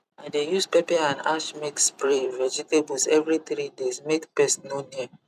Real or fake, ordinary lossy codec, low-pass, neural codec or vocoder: fake; none; 14.4 kHz; vocoder, 44.1 kHz, 128 mel bands every 256 samples, BigVGAN v2